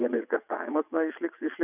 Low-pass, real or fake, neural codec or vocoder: 3.6 kHz; fake; vocoder, 22.05 kHz, 80 mel bands, WaveNeXt